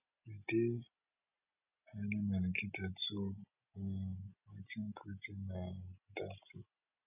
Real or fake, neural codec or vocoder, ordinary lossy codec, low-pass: real; none; none; 3.6 kHz